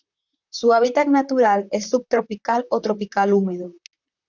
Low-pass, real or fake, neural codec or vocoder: 7.2 kHz; fake; vocoder, 44.1 kHz, 128 mel bands, Pupu-Vocoder